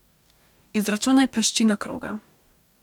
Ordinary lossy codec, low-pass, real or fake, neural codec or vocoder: none; 19.8 kHz; fake; codec, 44.1 kHz, 2.6 kbps, DAC